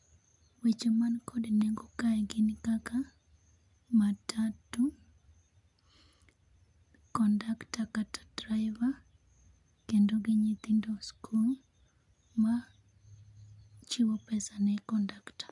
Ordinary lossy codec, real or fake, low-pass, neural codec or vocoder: none; real; 10.8 kHz; none